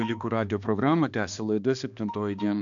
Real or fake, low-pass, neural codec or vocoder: fake; 7.2 kHz; codec, 16 kHz, 2 kbps, X-Codec, HuBERT features, trained on balanced general audio